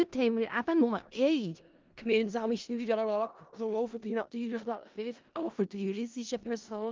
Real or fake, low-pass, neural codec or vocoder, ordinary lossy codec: fake; 7.2 kHz; codec, 16 kHz in and 24 kHz out, 0.4 kbps, LongCat-Audio-Codec, four codebook decoder; Opus, 24 kbps